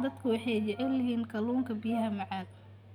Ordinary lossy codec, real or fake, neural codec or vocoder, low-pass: none; real; none; 19.8 kHz